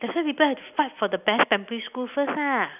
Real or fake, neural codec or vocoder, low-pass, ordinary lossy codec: real; none; 3.6 kHz; none